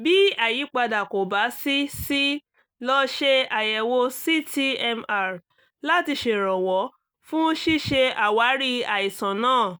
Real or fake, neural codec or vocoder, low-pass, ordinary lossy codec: real; none; none; none